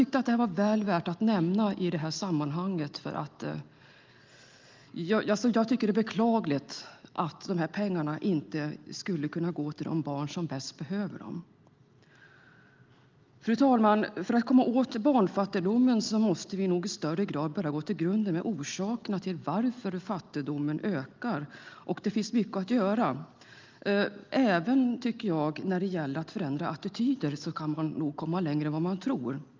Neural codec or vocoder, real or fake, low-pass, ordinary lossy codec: none; real; 7.2 kHz; Opus, 24 kbps